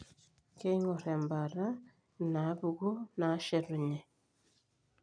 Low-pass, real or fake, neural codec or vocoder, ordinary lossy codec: 9.9 kHz; real; none; none